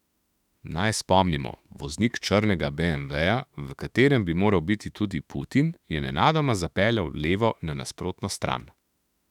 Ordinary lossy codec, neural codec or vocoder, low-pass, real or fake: none; autoencoder, 48 kHz, 32 numbers a frame, DAC-VAE, trained on Japanese speech; 19.8 kHz; fake